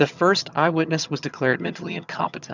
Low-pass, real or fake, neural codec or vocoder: 7.2 kHz; fake; vocoder, 22.05 kHz, 80 mel bands, HiFi-GAN